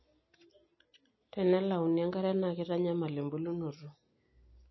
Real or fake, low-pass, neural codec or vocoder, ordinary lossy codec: real; 7.2 kHz; none; MP3, 24 kbps